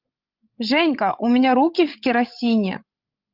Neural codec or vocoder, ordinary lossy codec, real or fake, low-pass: codec, 16 kHz, 16 kbps, FreqCodec, larger model; Opus, 32 kbps; fake; 5.4 kHz